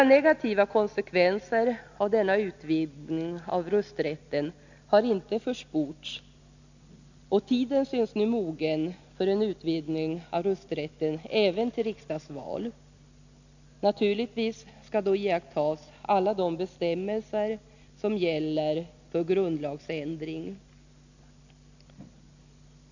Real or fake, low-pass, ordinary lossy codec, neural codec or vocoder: real; 7.2 kHz; none; none